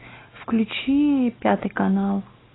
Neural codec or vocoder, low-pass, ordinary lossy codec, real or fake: none; 7.2 kHz; AAC, 16 kbps; real